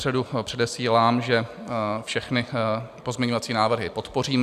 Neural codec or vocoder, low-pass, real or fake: none; 14.4 kHz; real